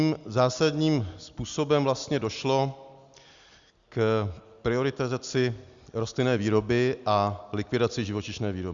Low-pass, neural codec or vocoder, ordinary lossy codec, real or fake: 7.2 kHz; none; Opus, 64 kbps; real